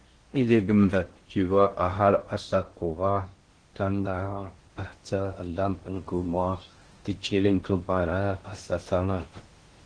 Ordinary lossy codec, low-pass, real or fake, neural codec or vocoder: Opus, 16 kbps; 9.9 kHz; fake; codec, 16 kHz in and 24 kHz out, 0.6 kbps, FocalCodec, streaming, 2048 codes